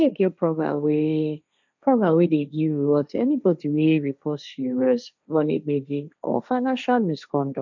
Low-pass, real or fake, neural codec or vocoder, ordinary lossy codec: 7.2 kHz; fake; codec, 16 kHz, 1.1 kbps, Voila-Tokenizer; none